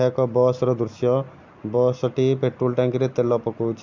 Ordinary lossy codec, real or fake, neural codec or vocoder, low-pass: MP3, 64 kbps; real; none; 7.2 kHz